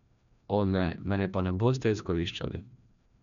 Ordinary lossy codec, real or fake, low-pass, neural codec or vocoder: none; fake; 7.2 kHz; codec, 16 kHz, 1 kbps, FreqCodec, larger model